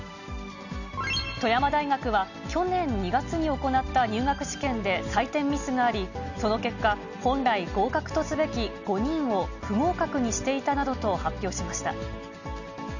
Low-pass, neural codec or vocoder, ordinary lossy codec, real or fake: 7.2 kHz; none; none; real